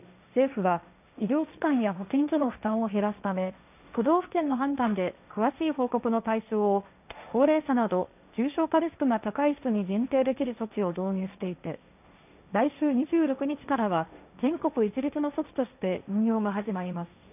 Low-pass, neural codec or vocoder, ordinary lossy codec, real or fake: 3.6 kHz; codec, 16 kHz, 1.1 kbps, Voila-Tokenizer; none; fake